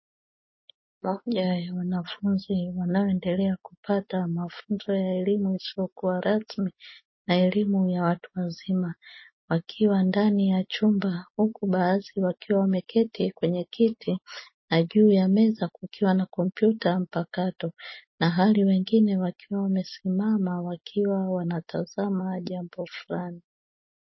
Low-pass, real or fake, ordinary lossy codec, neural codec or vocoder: 7.2 kHz; real; MP3, 24 kbps; none